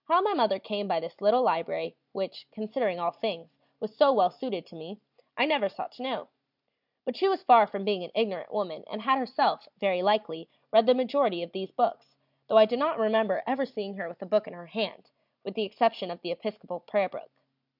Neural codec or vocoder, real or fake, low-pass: none; real; 5.4 kHz